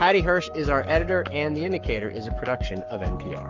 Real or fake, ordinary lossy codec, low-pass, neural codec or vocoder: fake; Opus, 32 kbps; 7.2 kHz; codec, 44.1 kHz, 7.8 kbps, Pupu-Codec